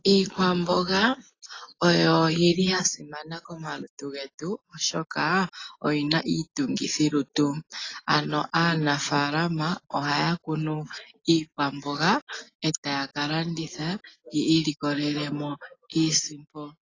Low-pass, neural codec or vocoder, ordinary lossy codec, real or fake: 7.2 kHz; none; AAC, 32 kbps; real